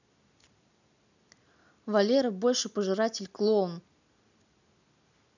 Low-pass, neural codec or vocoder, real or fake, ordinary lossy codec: 7.2 kHz; none; real; none